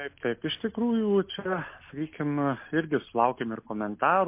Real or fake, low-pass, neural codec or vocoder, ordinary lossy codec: real; 3.6 kHz; none; MP3, 24 kbps